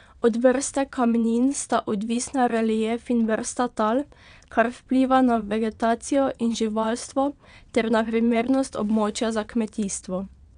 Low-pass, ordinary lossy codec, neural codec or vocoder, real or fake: 9.9 kHz; none; vocoder, 22.05 kHz, 80 mel bands, WaveNeXt; fake